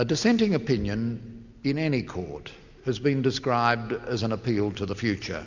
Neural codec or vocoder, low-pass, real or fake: none; 7.2 kHz; real